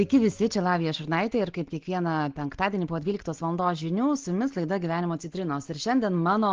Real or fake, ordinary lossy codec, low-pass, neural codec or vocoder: real; Opus, 16 kbps; 7.2 kHz; none